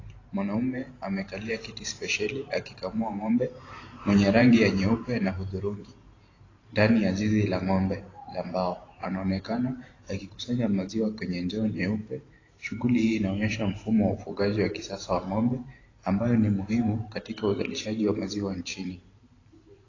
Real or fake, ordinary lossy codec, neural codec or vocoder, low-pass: fake; AAC, 32 kbps; vocoder, 44.1 kHz, 128 mel bands every 512 samples, BigVGAN v2; 7.2 kHz